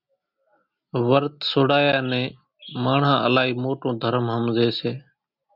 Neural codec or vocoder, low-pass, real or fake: none; 5.4 kHz; real